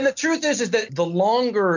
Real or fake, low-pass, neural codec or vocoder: real; 7.2 kHz; none